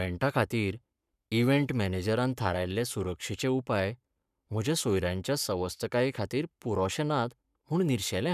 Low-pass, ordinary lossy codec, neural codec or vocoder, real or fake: 14.4 kHz; none; codec, 44.1 kHz, 7.8 kbps, Pupu-Codec; fake